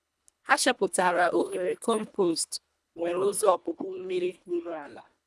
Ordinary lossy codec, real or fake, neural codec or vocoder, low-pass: none; fake; codec, 24 kHz, 1.5 kbps, HILCodec; none